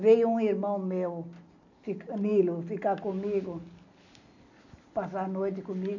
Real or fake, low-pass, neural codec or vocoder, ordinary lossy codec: real; 7.2 kHz; none; none